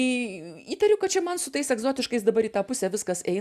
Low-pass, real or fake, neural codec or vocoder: 14.4 kHz; real; none